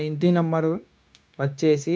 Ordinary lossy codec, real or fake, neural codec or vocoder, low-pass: none; fake; codec, 16 kHz, 0.9 kbps, LongCat-Audio-Codec; none